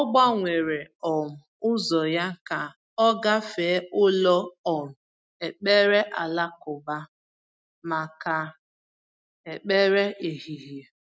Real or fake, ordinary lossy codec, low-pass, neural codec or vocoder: real; none; none; none